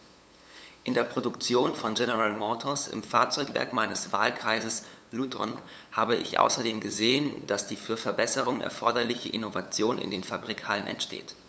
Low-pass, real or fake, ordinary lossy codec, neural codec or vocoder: none; fake; none; codec, 16 kHz, 8 kbps, FunCodec, trained on LibriTTS, 25 frames a second